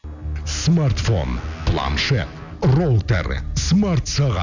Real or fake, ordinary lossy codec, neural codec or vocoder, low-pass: real; none; none; 7.2 kHz